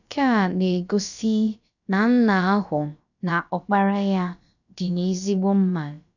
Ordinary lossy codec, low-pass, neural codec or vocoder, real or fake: none; 7.2 kHz; codec, 16 kHz, about 1 kbps, DyCAST, with the encoder's durations; fake